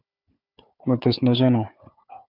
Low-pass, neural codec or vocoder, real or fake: 5.4 kHz; codec, 16 kHz, 4 kbps, FunCodec, trained on Chinese and English, 50 frames a second; fake